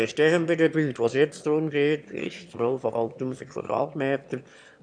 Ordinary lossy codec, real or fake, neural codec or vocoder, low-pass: none; fake; autoencoder, 22.05 kHz, a latent of 192 numbers a frame, VITS, trained on one speaker; 9.9 kHz